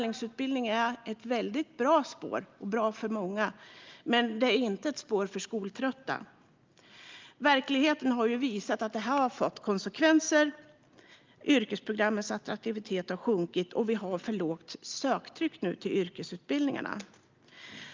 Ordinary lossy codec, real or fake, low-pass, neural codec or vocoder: Opus, 24 kbps; real; 7.2 kHz; none